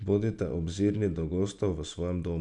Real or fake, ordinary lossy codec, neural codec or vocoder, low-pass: real; none; none; 10.8 kHz